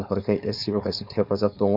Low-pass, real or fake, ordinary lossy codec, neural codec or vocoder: 5.4 kHz; fake; none; codec, 16 kHz, 4.8 kbps, FACodec